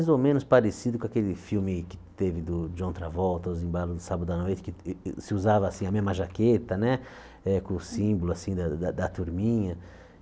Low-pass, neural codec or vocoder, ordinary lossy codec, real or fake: none; none; none; real